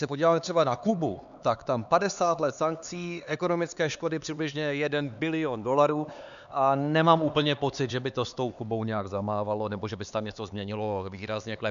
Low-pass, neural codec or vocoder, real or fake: 7.2 kHz; codec, 16 kHz, 4 kbps, X-Codec, HuBERT features, trained on LibriSpeech; fake